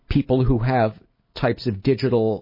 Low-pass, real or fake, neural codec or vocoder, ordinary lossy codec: 5.4 kHz; real; none; MP3, 24 kbps